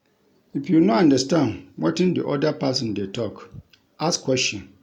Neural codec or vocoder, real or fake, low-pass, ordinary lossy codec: none; real; 19.8 kHz; none